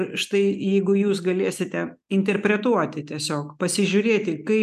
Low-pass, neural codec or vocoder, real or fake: 14.4 kHz; none; real